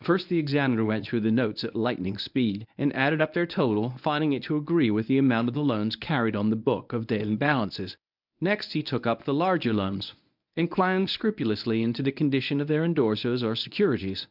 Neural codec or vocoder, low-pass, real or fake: codec, 24 kHz, 0.9 kbps, WavTokenizer, medium speech release version 1; 5.4 kHz; fake